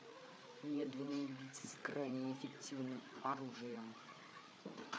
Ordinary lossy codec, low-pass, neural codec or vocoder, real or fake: none; none; codec, 16 kHz, 8 kbps, FreqCodec, larger model; fake